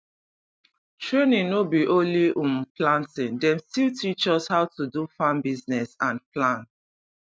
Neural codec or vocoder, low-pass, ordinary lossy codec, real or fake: none; none; none; real